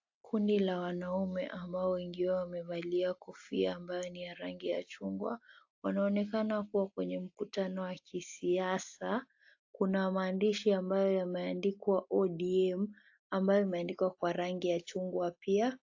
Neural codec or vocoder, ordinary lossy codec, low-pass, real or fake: none; AAC, 48 kbps; 7.2 kHz; real